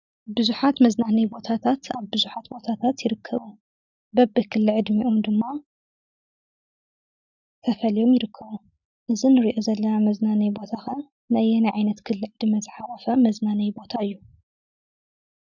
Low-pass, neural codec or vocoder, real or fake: 7.2 kHz; none; real